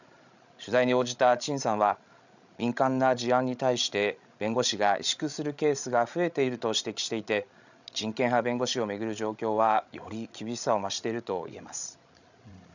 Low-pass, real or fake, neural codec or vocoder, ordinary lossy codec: 7.2 kHz; fake; codec, 16 kHz, 16 kbps, FreqCodec, larger model; none